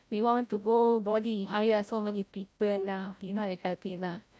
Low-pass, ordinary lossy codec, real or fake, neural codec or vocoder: none; none; fake; codec, 16 kHz, 0.5 kbps, FreqCodec, larger model